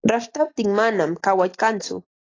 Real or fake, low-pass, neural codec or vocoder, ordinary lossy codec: real; 7.2 kHz; none; AAC, 32 kbps